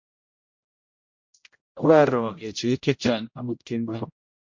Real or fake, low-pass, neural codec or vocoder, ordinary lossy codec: fake; 7.2 kHz; codec, 16 kHz, 0.5 kbps, X-Codec, HuBERT features, trained on general audio; MP3, 48 kbps